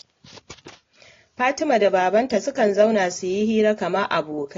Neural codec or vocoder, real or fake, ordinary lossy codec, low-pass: none; real; AAC, 32 kbps; 7.2 kHz